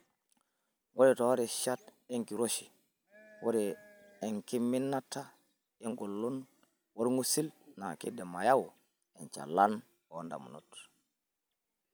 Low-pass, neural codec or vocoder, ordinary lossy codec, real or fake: none; none; none; real